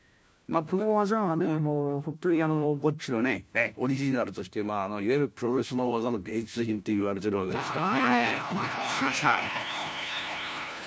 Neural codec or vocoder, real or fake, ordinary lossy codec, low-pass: codec, 16 kHz, 1 kbps, FunCodec, trained on LibriTTS, 50 frames a second; fake; none; none